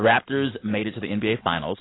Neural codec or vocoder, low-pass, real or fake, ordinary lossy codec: none; 7.2 kHz; real; AAC, 16 kbps